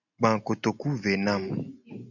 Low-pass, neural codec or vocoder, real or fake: 7.2 kHz; none; real